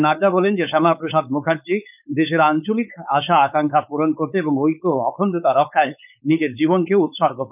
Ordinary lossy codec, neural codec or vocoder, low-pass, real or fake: none; codec, 16 kHz, 4.8 kbps, FACodec; 3.6 kHz; fake